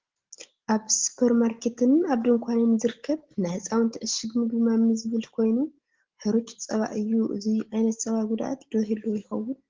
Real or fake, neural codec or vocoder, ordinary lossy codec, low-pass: real; none; Opus, 16 kbps; 7.2 kHz